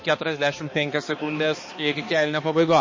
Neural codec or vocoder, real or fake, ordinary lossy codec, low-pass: codec, 16 kHz, 2 kbps, X-Codec, HuBERT features, trained on balanced general audio; fake; MP3, 32 kbps; 7.2 kHz